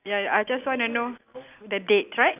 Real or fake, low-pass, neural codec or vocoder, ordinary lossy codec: real; 3.6 kHz; none; none